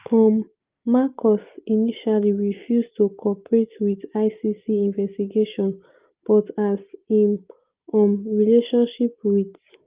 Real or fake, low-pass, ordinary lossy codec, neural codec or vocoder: real; 3.6 kHz; Opus, 32 kbps; none